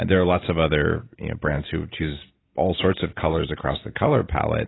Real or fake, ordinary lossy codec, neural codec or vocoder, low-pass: real; AAC, 16 kbps; none; 7.2 kHz